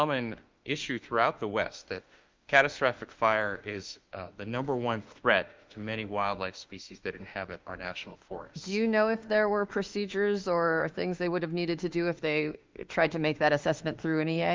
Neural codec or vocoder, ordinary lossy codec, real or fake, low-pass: autoencoder, 48 kHz, 32 numbers a frame, DAC-VAE, trained on Japanese speech; Opus, 24 kbps; fake; 7.2 kHz